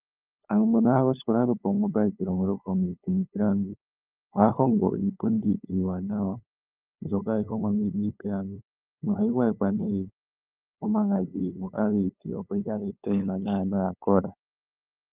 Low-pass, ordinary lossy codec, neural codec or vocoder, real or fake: 3.6 kHz; Opus, 32 kbps; codec, 16 kHz, 8 kbps, FunCodec, trained on LibriTTS, 25 frames a second; fake